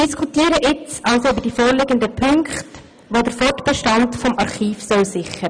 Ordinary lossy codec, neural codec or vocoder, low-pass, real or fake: none; none; 9.9 kHz; real